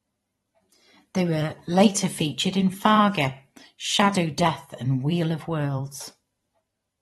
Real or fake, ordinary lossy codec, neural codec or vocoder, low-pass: fake; AAC, 32 kbps; vocoder, 44.1 kHz, 128 mel bands every 512 samples, BigVGAN v2; 19.8 kHz